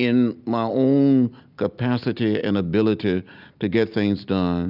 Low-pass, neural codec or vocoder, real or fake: 5.4 kHz; none; real